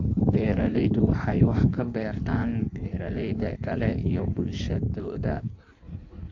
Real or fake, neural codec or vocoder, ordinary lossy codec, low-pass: fake; codec, 16 kHz in and 24 kHz out, 1.1 kbps, FireRedTTS-2 codec; AAC, 48 kbps; 7.2 kHz